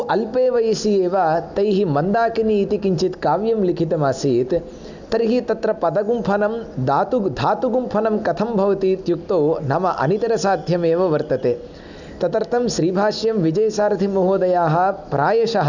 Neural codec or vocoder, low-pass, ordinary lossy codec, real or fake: none; 7.2 kHz; none; real